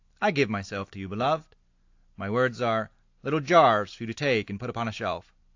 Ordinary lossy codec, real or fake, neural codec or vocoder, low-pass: AAC, 48 kbps; real; none; 7.2 kHz